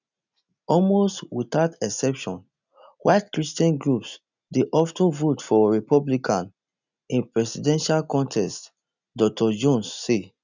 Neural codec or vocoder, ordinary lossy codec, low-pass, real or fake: none; none; 7.2 kHz; real